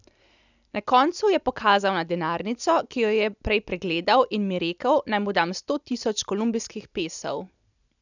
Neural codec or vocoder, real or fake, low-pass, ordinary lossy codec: none; real; 7.2 kHz; none